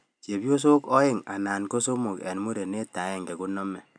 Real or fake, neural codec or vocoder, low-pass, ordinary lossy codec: real; none; 9.9 kHz; none